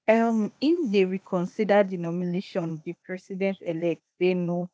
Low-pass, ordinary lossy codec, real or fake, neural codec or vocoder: none; none; fake; codec, 16 kHz, 0.8 kbps, ZipCodec